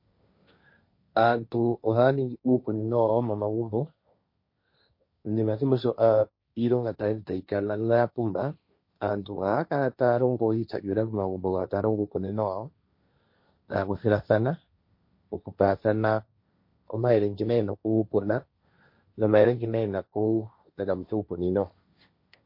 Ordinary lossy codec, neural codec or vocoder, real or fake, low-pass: MP3, 32 kbps; codec, 16 kHz, 1.1 kbps, Voila-Tokenizer; fake; 5.4 kHz